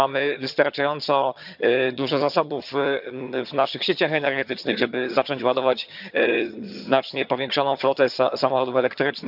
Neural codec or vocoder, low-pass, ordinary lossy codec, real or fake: vocoder, 22.05 kHz, 80 mel bands, HiFi-GAN; 5.4 kHz; none; fake